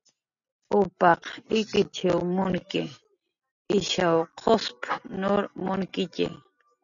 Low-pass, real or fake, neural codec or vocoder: 7.2 kHz; real; none